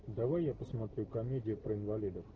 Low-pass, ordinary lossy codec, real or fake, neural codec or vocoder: 7.2 kHz; Opus, 16 kbps; real; none